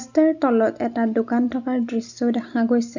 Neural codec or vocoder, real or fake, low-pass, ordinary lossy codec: none; real; 7.2 kHz; none